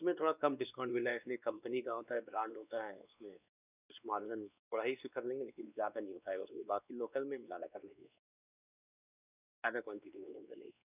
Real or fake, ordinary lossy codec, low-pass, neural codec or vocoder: fake; none; 3.6 kHz; codec, 16 kHz, 2 kbps, X-Codec, WavLM features, trained on Multilingual LibriSpeech